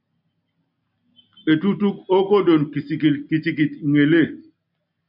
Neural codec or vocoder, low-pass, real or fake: none; 5.4 kHz; real